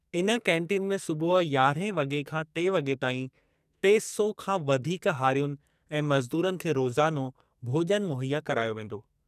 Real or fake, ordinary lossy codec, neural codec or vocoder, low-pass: fake; none; codec, 44.1 kHz, 2.6 kbps, SNAC; 14.4 kHz